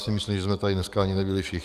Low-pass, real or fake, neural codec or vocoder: 14.4 kHz; fake; codec, 44.1 kHz, 7.8 kbps, DAC